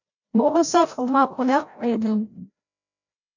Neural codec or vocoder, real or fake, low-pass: codec, 16 kHz, 0.5 kbps, FreqCodec, larger model; fake; 7.2 kHz